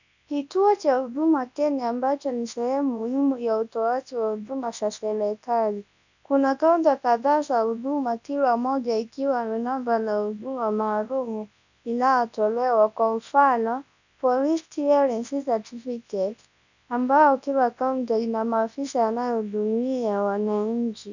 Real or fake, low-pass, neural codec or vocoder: fake; 7.2 kHz; codec, 24 kHz, 0.9 kbps, WavTokenizer, large speech release